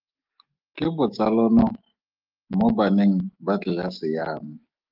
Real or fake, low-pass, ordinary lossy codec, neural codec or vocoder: fake; 5.4 kHz; Opus, 32 kbps; autoencoder, 48 kHz, 128 numbers a frame, DAC-VAE, trained on Japanese speech